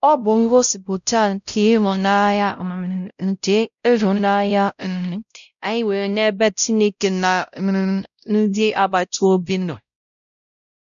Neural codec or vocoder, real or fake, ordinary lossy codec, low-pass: codec, 16 kHz, 0.5 kbps, X-Codec, WavLM features, trained on Multilingual LibriSpeech; fake; none; 7.2 kHz